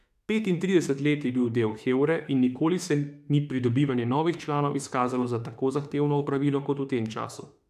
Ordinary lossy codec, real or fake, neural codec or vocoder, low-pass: AAC, 96 kbps; fake; autoencoder, 48 kHz, 32 numbers a frame, DAC-VAE, trained on Japanese speech; 14.4 kHz